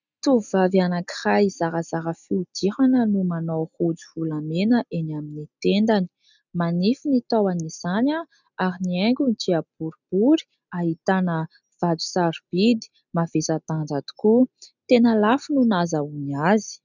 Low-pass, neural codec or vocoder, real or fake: 7.2 kHz; none; real